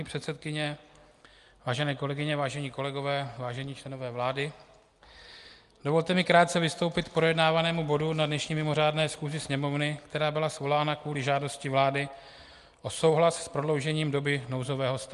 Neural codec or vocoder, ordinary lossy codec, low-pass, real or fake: none; Opus, 32 kbps; 10.8 kHz; real